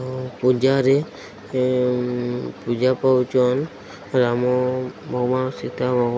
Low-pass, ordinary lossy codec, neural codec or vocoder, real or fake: none; none; none; real